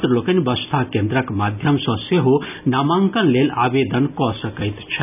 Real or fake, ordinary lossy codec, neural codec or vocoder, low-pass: real; none; none; 3.6 kHz